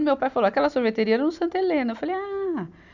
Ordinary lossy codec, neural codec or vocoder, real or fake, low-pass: none; none; real; 7.2 kHz